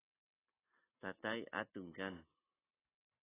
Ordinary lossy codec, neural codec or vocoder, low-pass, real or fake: AAC, 16 kbps; none; 3.6 kHz; real